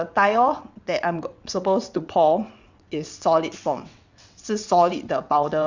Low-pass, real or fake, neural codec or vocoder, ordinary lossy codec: 7.2 kHz; fake; vocoder, 22.05 kHz, 80 mel bands, WaveNeXt; Opus, 64 kbps